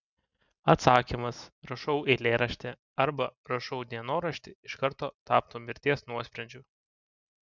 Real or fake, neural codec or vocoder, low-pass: real; none; 7.2 kHz